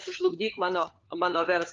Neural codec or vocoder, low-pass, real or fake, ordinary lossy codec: codec, 16 kHz, 4 kbps, FunCodec, trained on LibriTTS, 50 frames a second; 7.2 kHz; fake; Opus, 24 kbps